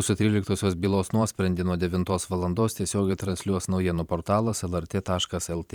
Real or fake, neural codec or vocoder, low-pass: fake; vocoder, 44.1 kHz, 128 mel bands every 512 samples, BigVGAN v2; 19.8 kHz